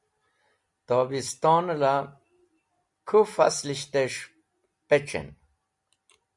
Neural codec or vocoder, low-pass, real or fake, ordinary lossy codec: none; 10.8 kHz; real; Opus, 64 kbps